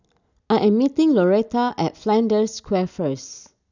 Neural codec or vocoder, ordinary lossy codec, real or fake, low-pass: none; none; real; 7.2 kHz